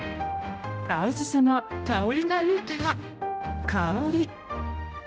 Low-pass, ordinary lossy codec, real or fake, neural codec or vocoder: none; none; fake; codec, 16 kHz, 0.5 kbps, X-Codec, HuBERT features, trained on general audio